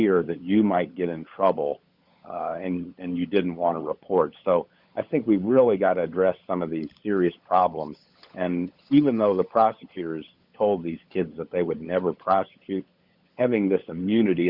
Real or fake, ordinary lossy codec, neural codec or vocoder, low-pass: fake; Opus, 64 kbps; codec, 16 kHz, 16 kbps, FunCodec, trained on LibriTTS, 50 frames a second; 5.4 kHz